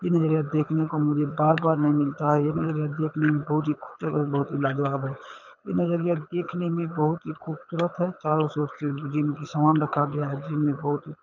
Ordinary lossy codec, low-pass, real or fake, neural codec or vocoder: none; 7.2 kHz; fake; codec, 24 kHz, 6 kbps, HILCodec